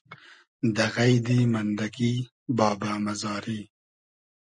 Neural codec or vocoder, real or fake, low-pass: none; real; 9.9 kHz